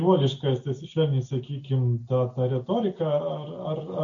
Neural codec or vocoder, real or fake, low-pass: none; real; 7.2 kHz